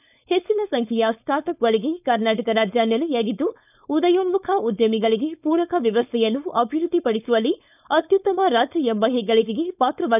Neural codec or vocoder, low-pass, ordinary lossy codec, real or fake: codec, 16 kHz, 4.8 kbps, FACodec; 3.6 kHz; none; fake